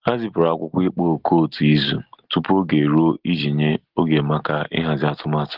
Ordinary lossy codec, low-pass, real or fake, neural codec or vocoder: Opus, 16 kbps; 5.4 kHz; real; none